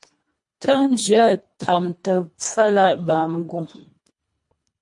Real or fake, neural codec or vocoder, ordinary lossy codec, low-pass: fake; codec, 24 kHz, 1.5 kbps, HILCodec; MP3, 48 kbps; 10.8 kHz